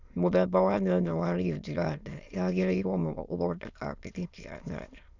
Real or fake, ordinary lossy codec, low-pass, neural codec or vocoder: fake; none; 7.2 kHz; autoencoder, 22.05 kHz, a latent of 192 numbers a frame, VITS, trained on many speakers